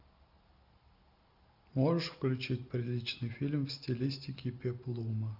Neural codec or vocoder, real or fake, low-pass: none; real; 5.4 kHz